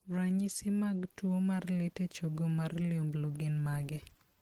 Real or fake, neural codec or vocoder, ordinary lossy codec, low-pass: real; none; Opus, 16 kbps; 19.8 kHz